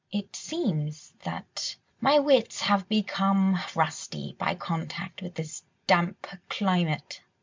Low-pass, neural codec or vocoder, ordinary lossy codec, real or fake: 7.2 kHz; none; MP3, 64 kbps; real